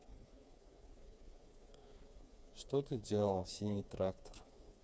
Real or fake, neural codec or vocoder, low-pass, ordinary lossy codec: fake; codec, 16 kHz, 4 kbps, FreqCodec, smaller model; none; none